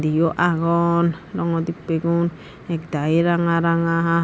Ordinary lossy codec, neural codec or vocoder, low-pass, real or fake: none; none; none; real